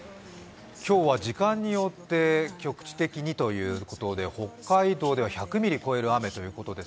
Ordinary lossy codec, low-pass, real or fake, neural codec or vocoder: none; none; real; none